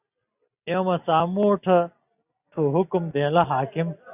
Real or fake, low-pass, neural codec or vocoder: real; 3.6 kHz; none